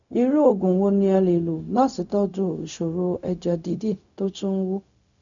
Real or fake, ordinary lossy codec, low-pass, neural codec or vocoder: fake; none; 7.2 kHz; codec, 16 kHz, 0.4 kbps, LongCat-Audio-Codec